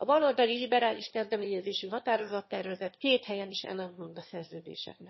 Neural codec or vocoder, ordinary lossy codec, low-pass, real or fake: autoencoder, 22.05 kHz, a latent of 192 numbers a frame, VITS, trained on one speaker; MP3, 24 kbps; 7.2 kHz; fake